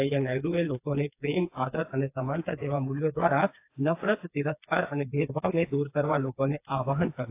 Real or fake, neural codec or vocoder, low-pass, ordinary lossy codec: fake; codec, 16 kHz, 4 kbps, FreqCodec, smaller model; 3.6 kHz; AAC, 24 kbps